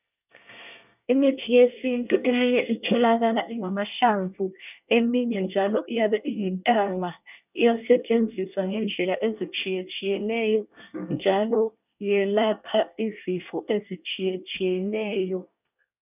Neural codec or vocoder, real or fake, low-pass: codec, 24 kHz, 1 kbps, SNAC; fake; 3.6 kHz